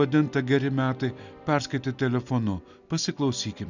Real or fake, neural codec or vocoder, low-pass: real; none; 7.2 kHz